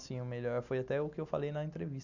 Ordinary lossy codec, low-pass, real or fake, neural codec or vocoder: none; 7.2 kHz; real; none